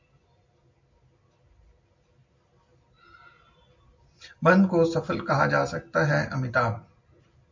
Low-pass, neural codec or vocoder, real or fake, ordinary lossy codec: 7.2 kHz; none; real; MP3, 48 kbps